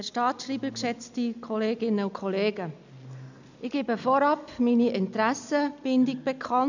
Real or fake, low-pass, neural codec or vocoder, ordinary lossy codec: real; 7.2 kHz; none; none